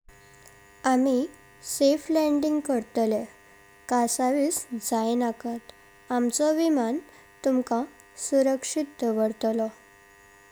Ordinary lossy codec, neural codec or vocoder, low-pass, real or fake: none; none; none; real